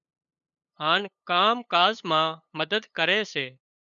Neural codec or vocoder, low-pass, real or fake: codec, 16 kHz, 8 kbps, FunCodec, trained on LibriTTS, 25 frames a second; 7.2 kHz; fake